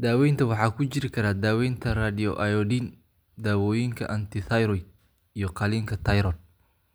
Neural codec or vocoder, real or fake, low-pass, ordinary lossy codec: none; real; none; none